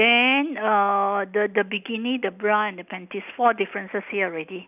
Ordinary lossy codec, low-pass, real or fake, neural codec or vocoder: none; 3.6 kHz; real; none